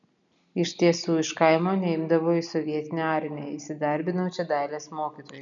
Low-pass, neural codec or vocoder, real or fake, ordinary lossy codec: 7.2 kHz; none; real; MP3, 96 kbps